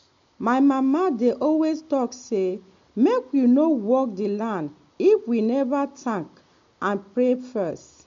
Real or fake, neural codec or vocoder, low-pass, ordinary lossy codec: real; none; 7.2 kHz; MP3, 48 kbps